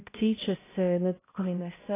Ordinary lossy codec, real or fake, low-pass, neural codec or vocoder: AAC, 16 kbps; fake; 3.6 kHz; codec, 16 kHz, 0.5 kbps, X-Codec, HuBERT features, trained on balanced general audio